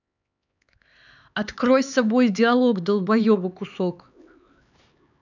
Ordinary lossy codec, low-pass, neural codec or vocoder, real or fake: none; 7.2 kHz; codec, 16 kHz, 4 kbps, X-Codec, HuBERT features, trained on LibriSpeech; fake